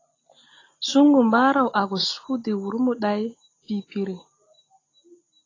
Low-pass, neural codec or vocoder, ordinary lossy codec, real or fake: 7.2 kHz; none; AAC, 32 kbps; real